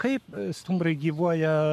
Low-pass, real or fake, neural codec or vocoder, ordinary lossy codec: 14.4 kHz; fake; codec, 44.1 kHz, 7.8 kbps, Pupu-Codec; MP3, 96 kbps